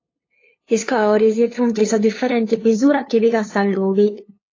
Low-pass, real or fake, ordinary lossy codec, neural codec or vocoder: 7.2 kHz; fake; AAC, 32 kbps; codec, 16 kHz, 2 kbps, FunCodec, trained on LibriTTS, 25 frames a second